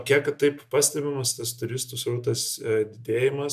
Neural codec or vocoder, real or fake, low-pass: none; real; 14.4 kHz